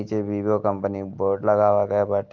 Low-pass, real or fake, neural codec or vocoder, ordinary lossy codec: 7.2 kHz; real; none; Opus, 32 kbps